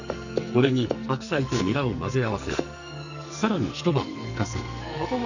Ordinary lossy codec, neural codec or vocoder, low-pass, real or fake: none; codec, 44.1 kHz, 2.6 kbps, SNAC; 7.2 kHz; fake